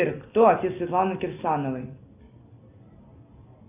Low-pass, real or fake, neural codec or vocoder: 3.6 kHz; real; none